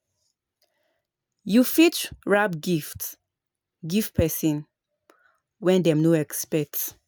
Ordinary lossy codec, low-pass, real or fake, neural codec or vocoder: none; none; real; none